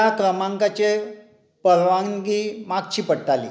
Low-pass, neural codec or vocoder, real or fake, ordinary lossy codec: none; none; real; none